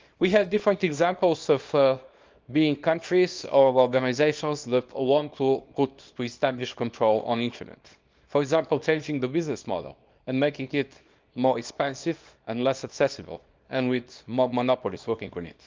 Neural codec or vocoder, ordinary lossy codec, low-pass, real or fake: codec, 24 kHz, 0.9 kbps, WavTokenizer, small release; Opus, 24 kbps; 7.2 kHz; fake